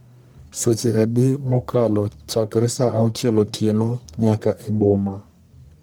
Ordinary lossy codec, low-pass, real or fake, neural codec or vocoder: none; none; fake; codec, 44.1 kHz, 1.7 kbps, Pupu-Codec